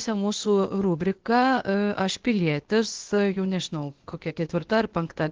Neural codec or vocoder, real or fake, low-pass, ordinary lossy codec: codec, 16 kHz, 0.8 kbps, ZipCodec; fake; 7.2 kHz; Opus, 16 kbps